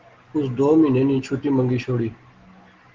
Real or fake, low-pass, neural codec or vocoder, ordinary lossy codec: real; 7.2 kHz; none; Opus, 16 kbps